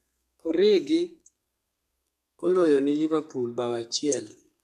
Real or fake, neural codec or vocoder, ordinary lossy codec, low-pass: fake; codec, 32 kHz, 1.9 kbps, SNAC; none; 14.4 kHz